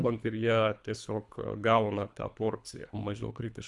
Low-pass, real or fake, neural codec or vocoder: 10.8 kHz; fake; codec, 24 kHz, 3 kbps, HILCodec